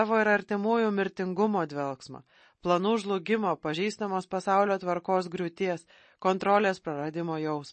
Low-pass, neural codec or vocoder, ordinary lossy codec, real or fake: 10.8 kHz; none; MP3, 32 kbps; real